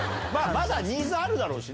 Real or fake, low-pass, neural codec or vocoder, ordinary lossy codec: real; none; none; none